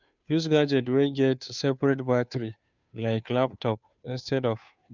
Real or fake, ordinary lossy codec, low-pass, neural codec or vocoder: fake; none; 7.2 kHz; codec, 16 kHz, 2 kbps, FunCodec, trained on Chinese and English, 25 frames a second